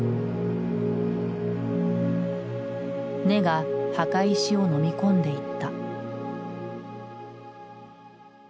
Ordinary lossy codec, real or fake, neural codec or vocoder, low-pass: none; real; none; none